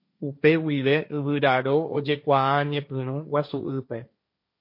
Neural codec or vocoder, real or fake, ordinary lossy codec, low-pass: codec, 16 kHz, 1.1 kbps, Voila-Tokenizer; fake; MP3, 32 kbps; 5.4 kHz